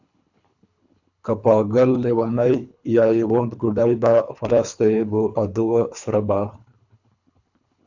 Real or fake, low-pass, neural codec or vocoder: fake; 7.2 kHz; codec, 24 kHz, 3 kbps, HILCodec